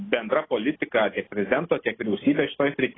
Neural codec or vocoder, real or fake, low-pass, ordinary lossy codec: none; real; 7.2 kHz; AAC, 16 kbps